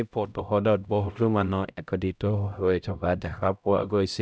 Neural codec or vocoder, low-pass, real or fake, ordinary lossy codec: codec, 16 kHz, 0.5 kbps, X-Codec, HuBERT features, trained on LibriSpeech; none; fake; none